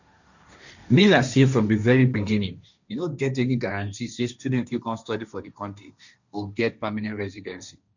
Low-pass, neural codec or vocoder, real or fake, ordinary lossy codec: 7.2 kHz; codec, 16 kHz, 1.1 kbps, Voila-Tokenizer; fake; none